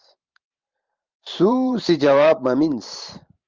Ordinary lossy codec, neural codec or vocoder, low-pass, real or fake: Opus, 24 kbps; vocoder, 44.1 kHz, 128 mel bands every 512 samples, BigVGAN v2; 7.2 kHz; fake